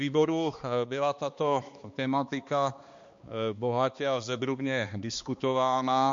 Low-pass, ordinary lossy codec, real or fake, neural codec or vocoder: 7.2 kHz; MP3, 48 kbps; fake; codec, 16 kHz, 2 kbps, X-Codec, HuBERT features, trained on balanced general audio